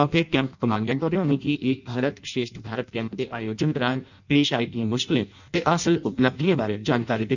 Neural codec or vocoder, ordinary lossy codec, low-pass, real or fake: codec, 16 kHz in and 24 kHz out, 0.6 kbps, FireRedTTS-2 codec; none; 7.2 kHz; fake